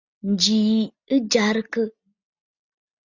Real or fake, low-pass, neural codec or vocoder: real; 7.2 kHz; none